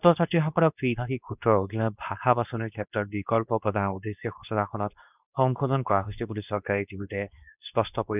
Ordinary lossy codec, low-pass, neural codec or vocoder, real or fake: none; 3.6 kHz; codec, 24 kHz, 0.9 kbps, WavTokenizer, medium speech release version 2; fake